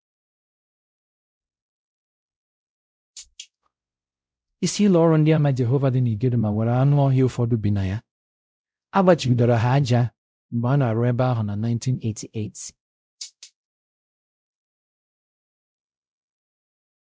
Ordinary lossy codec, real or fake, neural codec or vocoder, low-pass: none; fake; codec, 16 kHz, 0.5 kbps, X-Codec, WavLM features, trained on Multilingual LibriSpeech; none